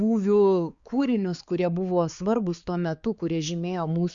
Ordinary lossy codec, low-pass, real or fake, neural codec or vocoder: Opus, 64 kbps; 7.2 kHz; fake; codec, 16 kHz, 4 kbps, X-Codec, HuBERT features, trained on balanced general audio